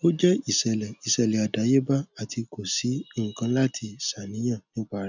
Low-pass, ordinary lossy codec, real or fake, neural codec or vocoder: 7.2 kHz; none; real; none